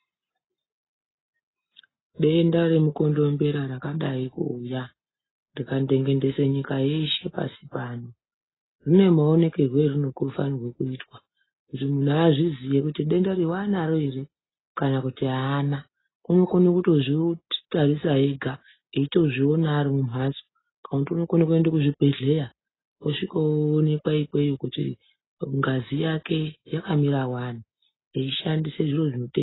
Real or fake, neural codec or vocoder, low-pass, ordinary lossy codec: real; none; 7.2 kHz; AAC, 16 kbps